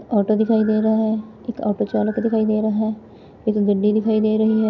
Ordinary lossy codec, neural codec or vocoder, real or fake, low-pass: none; none; real; 7.2 kHz